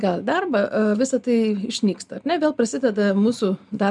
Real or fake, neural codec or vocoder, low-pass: real; none; 10.8 kHz